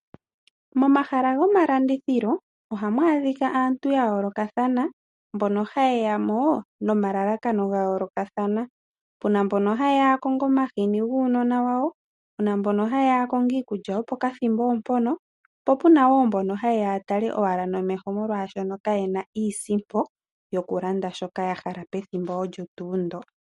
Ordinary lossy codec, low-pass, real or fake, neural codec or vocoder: MP3, 48 kbps; 19.8 kHz; real; none